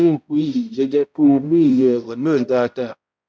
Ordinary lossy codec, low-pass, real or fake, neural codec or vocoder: none; none; fake; codec, 16 kHz, 0.5 kbps, X-Codec, HuBERT features, trained on balanced general audio